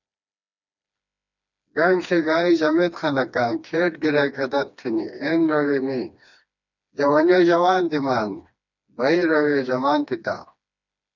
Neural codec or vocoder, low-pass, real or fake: codec, 16 kHz, 2 kbps, FreqCodec, smaller model; 7.2 kHz; fake